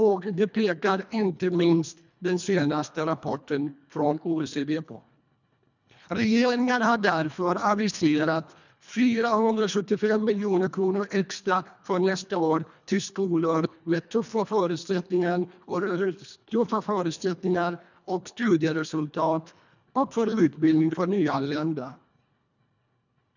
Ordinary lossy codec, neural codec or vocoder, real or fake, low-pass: none; codec, 24 kHz, 1.5 kbps, HILCodec; fake; 7.2 kHz